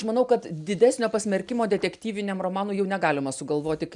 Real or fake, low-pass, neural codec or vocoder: real; 10.8 kHz; none